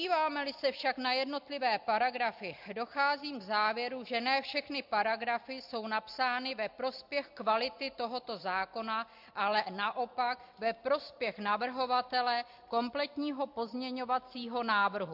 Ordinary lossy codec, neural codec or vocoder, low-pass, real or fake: MP3, 48 kbps; none; 5.4 kHz; real